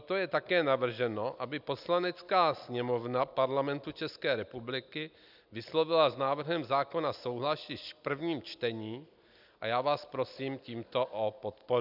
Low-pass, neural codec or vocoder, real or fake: 5.4 kHz; none; real